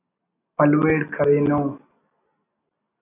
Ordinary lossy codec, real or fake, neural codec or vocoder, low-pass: AAC, 24 kbps; real; none; 3.6 kHz